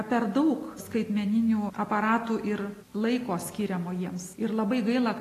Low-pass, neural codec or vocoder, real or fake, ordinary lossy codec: 14.4 kHz; none; real; AAC, 48 kbps